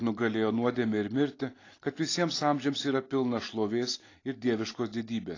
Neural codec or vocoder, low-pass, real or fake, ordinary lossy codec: none; 7.2 kHz; real; AAC, 32 kbps